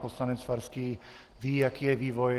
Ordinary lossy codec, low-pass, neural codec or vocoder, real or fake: Opus, 16 kbps; 14.4 kHz; none; real